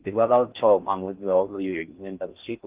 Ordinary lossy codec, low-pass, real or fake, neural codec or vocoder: Opus, 24 kbps; 3.6 kHz; fake; codec, 16 kHz in and 24 kHz out, 0.6 kbps, FocalCodec, streaming, 4096 codes